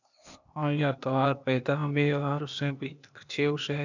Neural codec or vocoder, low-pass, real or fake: codec, 16 kHz, 0.8 kbps, ZipCodec; 7.2 kHz; fake